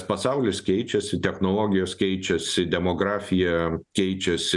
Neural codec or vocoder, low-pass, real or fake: none; 10.8 kHz; real